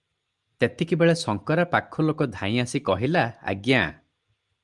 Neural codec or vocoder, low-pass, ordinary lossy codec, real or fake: none; 10.8 kHz; Opus, 32 kbps; real